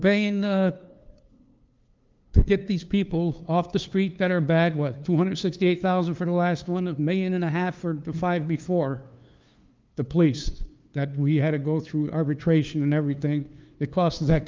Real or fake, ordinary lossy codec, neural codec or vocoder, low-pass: fake; Opus, 24 kbps; codec, 16 kHz, 2 kbps, FunCodec, trained on LibriTTS, 25 frames a second; 7.2 kHz